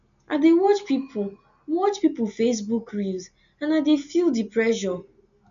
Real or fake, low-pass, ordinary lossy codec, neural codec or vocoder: real; 7.2 kHz; none; none